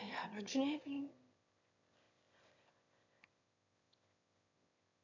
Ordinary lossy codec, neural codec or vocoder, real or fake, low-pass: none; autoencoder, 22.05 kHz, a latent of 192 numbers a frame, VITS, trained on one speaker; fake; 7.2 kHz